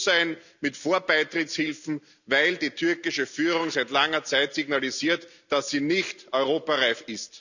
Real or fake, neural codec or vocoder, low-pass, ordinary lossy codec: real; none; 7.2 kHz; none